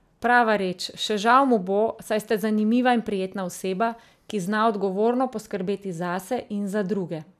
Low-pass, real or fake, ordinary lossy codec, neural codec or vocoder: 14.4 kHz; real; none; none